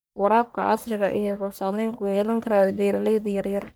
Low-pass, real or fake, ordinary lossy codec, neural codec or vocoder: none; fake; none; codec, 44.1 kHz, 1.7 kbps, Pupu-Codec